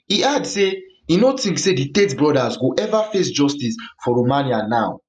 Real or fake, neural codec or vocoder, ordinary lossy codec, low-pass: real; none; none; 10.8 kHz